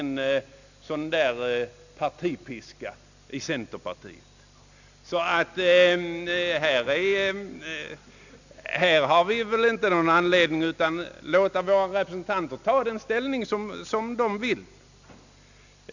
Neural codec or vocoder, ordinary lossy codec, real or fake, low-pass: none; none; real; 7.2 kHz